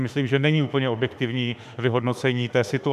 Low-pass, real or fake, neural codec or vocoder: 14.4 kHz; fake; autoencoder, 48 kHz, 32 numbers a frame, DAC-VAE, trained on Japanese speech